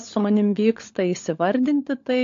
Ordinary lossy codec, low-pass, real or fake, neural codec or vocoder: AAC, 48 kbps; 7.2 kHz; fake; codec, 16 kHz, 16 kbps, FunCodec, trained on LibriTTS, 50 frames a second